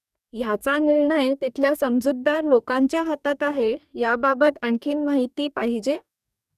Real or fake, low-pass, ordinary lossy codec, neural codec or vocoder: fake; 14.4 kHz; none; codec, 44.1 kHz, 2.6 kbps, DAC